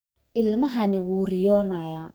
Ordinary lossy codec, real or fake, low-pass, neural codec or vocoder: none; fake; none; codec, 44.1 kHz, 2.6 kbps, SNAC